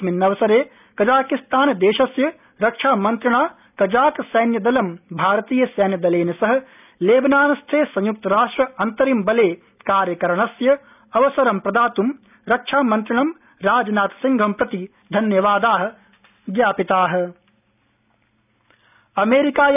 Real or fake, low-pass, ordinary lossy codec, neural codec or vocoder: real; 3.6 kHz; none; none